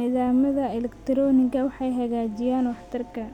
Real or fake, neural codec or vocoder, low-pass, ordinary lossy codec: real; none; 19.8 kHz; none